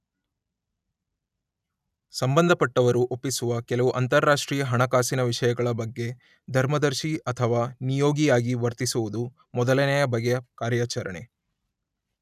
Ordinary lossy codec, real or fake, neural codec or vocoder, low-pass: none; real; none; 14.4 kHz